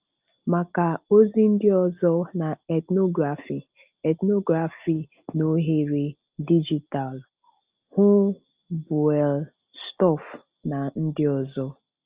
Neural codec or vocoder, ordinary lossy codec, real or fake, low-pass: none; Opus, 24 kbps; real; 3.6 kHz